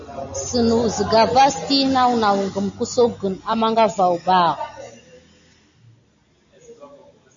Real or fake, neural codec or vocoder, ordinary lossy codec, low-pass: real; none; AAC, 64 kbps; 7.2 kHz